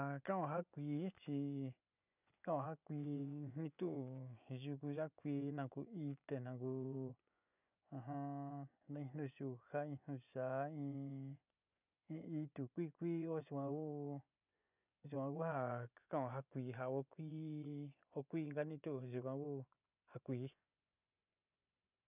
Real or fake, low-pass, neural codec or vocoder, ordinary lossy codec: fake; 3.6 kHz; vocoder, 24 kHz, 100 mel bands, Vocos; none